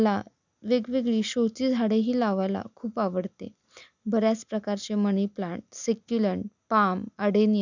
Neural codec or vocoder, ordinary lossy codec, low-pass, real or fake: none; none; 7.2 kHz; real